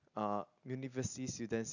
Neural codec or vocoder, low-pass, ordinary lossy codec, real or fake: none; 7.2 kHz; none; real